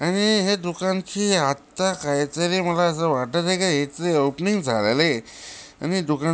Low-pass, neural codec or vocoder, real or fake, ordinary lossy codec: none; none; real; none